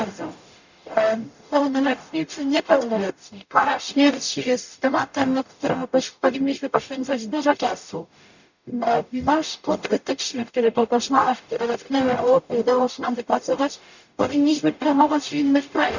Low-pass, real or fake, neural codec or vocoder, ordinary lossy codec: 7.2 kHz; fake; codec, 44.1 kHz, 0.9 kbps, DAC; none